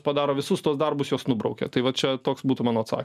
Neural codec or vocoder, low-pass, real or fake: none; 14.4 kHz; real